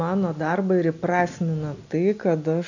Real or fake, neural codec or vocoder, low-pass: real; none; 7.2 kHz